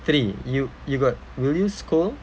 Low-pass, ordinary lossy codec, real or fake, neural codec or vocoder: none; none; real; none